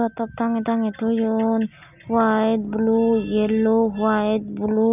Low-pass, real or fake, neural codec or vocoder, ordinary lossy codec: 3.6 kHz; real; none; none